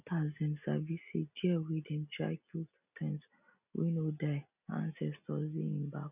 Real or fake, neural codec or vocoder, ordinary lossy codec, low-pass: real; none; none; 3.6 kHz